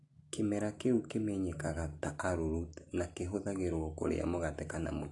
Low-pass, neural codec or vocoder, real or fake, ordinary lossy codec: 10.8 kHz; none; real; AAC, 48 kbps